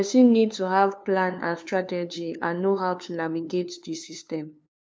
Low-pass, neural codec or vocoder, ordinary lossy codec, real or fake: none; codec, 16 kHz, 2 kbps, FunCodec, trained on LibriTTS, 25 frames a second; none; fake